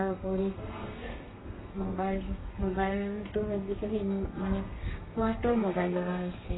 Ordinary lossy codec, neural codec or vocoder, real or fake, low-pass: AAC, 16 kbps; codec, 32 kHz, 1.9 kbps, SNAC; fake; 7.2 kHz